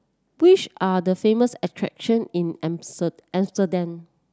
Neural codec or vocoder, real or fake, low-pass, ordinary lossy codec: none; real; none; none